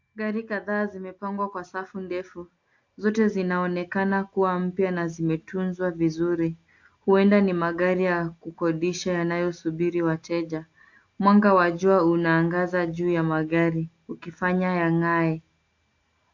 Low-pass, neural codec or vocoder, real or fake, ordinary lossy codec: 7.2 kHz; none; real; AAC, 48 kbps